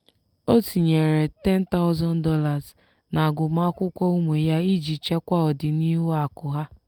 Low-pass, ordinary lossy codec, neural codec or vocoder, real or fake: 19.8 kHz; Opus, 32 kbps; none; real